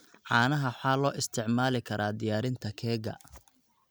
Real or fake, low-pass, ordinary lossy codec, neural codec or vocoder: real; none; none; none